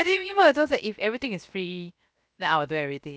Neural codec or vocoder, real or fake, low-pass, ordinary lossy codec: codec, 16 kHz, 0.7 kbps, FocalCodec; fake; none; none